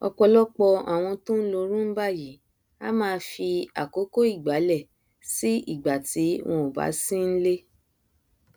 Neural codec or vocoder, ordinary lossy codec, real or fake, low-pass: none; none; real; none